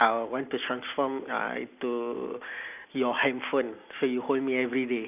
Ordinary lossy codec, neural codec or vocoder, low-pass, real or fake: none; none; 3.6 kHz; real